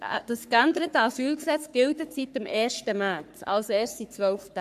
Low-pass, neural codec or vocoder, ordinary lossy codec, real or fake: 14.4 kHz; codec, 44.1 kHz, 3.4 kbps, Pupu-Codec; AAC, 96 kbps; fake